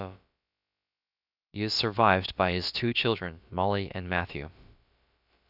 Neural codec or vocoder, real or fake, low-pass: codec, 16 kHz, about 1 kbps, DyCAST, with the encoder's durations; fake; 5.4 kHz